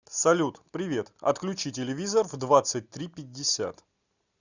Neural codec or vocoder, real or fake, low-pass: none; real; 7.2 kHz